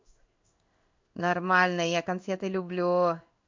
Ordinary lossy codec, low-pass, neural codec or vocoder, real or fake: MP3, 48 kbps; 7.2 kHz; codec, 16 kHz in and 24 kHz out, 1 kbps, XY-Tokenizer; fake